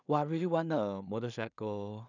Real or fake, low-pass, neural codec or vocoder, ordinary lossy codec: fake; 7.2 kHz; codec, 16 kHz in and 24 kHz out, 0.4 kbps, LongCat-Audio-Codec, two codebook decoder; none